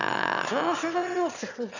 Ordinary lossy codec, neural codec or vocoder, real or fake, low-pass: none; autoencoder, 22.05 kHz, a latent of 192 numbers a frame, VITS, trained on one speaker; fake; 7.2 kHz